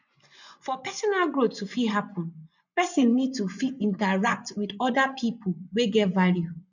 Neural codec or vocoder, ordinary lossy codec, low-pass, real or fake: vocoder, 24 kHz, 100 mel bands, Vocos; none; 7.2 kHz; fake